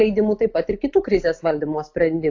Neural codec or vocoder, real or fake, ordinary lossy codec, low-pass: none; real; AAC, 48 kbps; 7.2 kHz